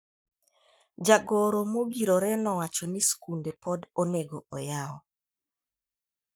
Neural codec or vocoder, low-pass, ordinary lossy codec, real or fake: codec, 44.1 kHz, 7.8 kbps, Pupu-Codec; none; none; fake